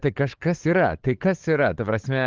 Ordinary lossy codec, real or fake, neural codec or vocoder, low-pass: Opus, 16 kbps; fake; codec, 16 kHz, 8 kbps, FunCodec, trained on LibriTTS, 25 frames a second; 7.2 kHz